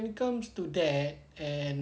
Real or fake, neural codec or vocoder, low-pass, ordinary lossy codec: real; none; none; none